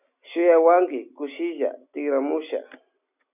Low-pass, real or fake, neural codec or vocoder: 3.6 kHz; real; none